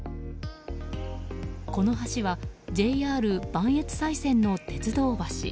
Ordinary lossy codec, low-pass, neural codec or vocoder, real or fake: none; none; none; real